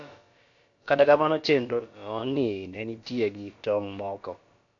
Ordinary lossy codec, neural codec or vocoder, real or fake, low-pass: AAC, 48 kbps; codec, 16 kHz, about 1 kbps, DyCAST, with the encoder's durations; fake; 7.2 kHz